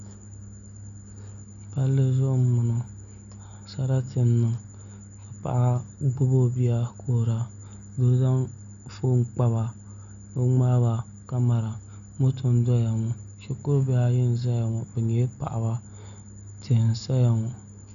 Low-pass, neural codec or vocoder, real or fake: 7.2 kHz; none; real